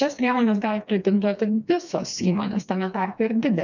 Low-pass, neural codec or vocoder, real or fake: 7.2 kHz; codec, 16 kHz, 2 kbps, FreqCodec, smaller model; fake